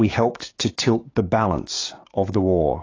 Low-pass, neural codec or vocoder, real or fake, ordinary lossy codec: 7.2 kHz; codec, 16 kHz, 6 kbps, DAC; fake; AAC, 32 kbps